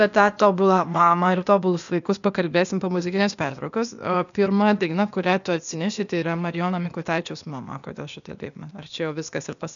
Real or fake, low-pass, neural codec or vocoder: fake; 7.2 kHz; codec, 16 kHz, 0.8 kbps, ZipCodec